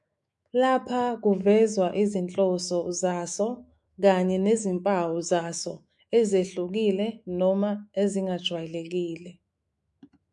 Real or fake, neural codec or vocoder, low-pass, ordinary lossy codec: fake; autoencoder, 48 kHz, 128 numbers a frame, DAC-VAE, trained on Japanese speech; 10.8 kHz; MP3, 96 kbps